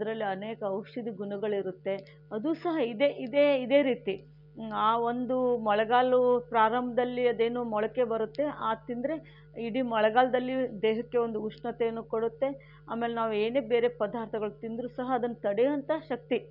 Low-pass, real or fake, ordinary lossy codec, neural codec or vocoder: 5.4 kHz; real; none; none